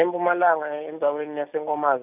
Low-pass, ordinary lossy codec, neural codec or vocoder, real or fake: 3.6 kHz; none; none; real